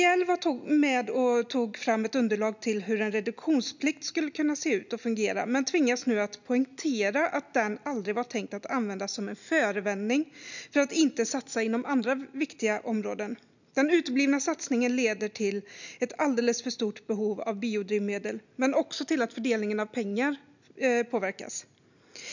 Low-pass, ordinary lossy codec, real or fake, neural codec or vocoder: 7.2 kHz; none; real; none